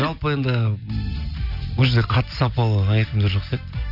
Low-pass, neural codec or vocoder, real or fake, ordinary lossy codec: 5.4 kHz; none; real; none